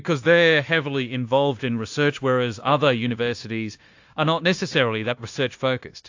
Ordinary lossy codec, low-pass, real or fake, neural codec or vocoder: AAC, 48 kbps; 7.2 kHz; fake; codec, 16 kHz, 0.9 kbps, LongCat-Audio-Codec